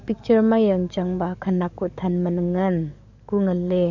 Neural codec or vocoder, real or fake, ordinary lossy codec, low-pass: codec, 44.1 kHz, 7.8 kbps, DAC; fake; none; 7.2 kHz